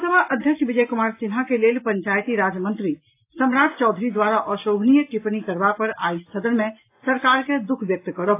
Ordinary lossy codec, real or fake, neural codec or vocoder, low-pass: AAC, 24 kbps; real; none; 3.6 kHz